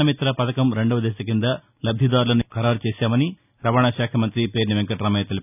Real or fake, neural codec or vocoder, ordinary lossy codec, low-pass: real; none; none; 3.6 kHz